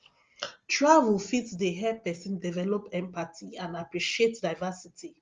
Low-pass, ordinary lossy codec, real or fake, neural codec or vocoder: 7.2 kHz; Opus, 32 kbps; real; none